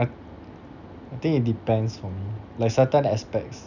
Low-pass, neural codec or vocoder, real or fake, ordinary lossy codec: 7.2 kHz; none; real; none